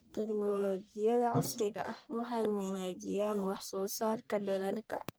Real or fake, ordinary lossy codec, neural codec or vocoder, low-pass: fake; none; codec, 44.1 kHz, 1.7 kbps, Pupu-Codec; none